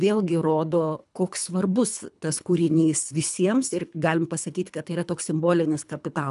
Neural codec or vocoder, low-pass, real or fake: codec, 24 kHz, 3 kbps, HILCodec; 10.8 kHz; fake